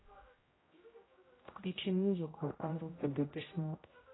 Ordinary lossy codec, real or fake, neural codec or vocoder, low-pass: AAC, 16 kbps; fake; codec, 16 kHz, 0.5 kbps, X-Codec, HuBERT features, trained on general audio; 7.2 kHz